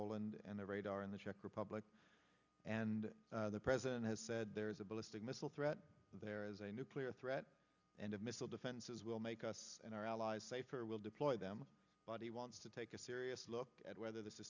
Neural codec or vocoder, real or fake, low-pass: none; real; 7.2 kHz